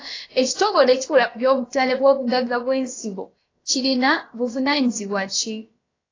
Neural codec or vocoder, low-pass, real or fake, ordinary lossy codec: codec, 16 kHz, about 1 kbps, DyCAST, with the encoder's durations; 7.2 kHz; fake; AAC, 32 kbps